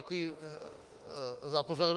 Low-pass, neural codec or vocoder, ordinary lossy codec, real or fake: 10.8 kHz; autoencoder, 48 kHz, 32 numbers a frame, DAC-VAE, trained on Japanese speech; Opus, 32 kbps; fake